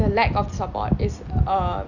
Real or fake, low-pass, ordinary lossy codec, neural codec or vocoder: real; 7.2 kHz; none; none